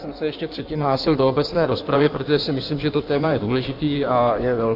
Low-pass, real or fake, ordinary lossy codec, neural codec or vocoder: 5.4 kHz; fake; MP3, 48 kbps; codec, 16 kHz in and 24 kHz out, 1.1 kbps, FireRedTTS-2 codec